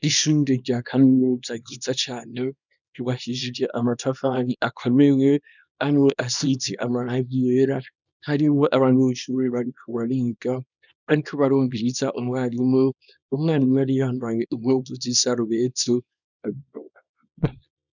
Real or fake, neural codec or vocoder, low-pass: fake; codec, 24 kHz, 0.9 kbps, WavTokenizer, small release; 7.2 kHz